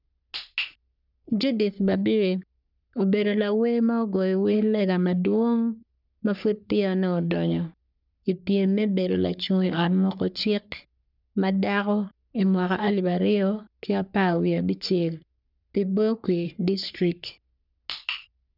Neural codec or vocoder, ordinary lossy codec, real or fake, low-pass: codec, 44.1 kHz, 3.4 kbps, Pupu-Codec; none; fake; 5.4 kHz